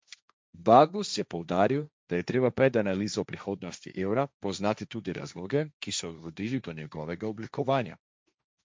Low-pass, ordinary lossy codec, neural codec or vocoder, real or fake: none; none; codec, 16 kHz, 1.1 kbps, Voila-Tokenizer; fake